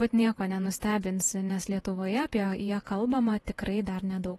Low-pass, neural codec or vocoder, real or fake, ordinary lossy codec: 19.8 kHz; vocoder, 48 kHz, 128 mel bands, Vocos; fake; AAC, 32 kbps